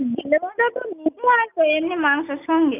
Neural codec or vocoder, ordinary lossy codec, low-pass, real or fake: none; none; 3.6 kHz; real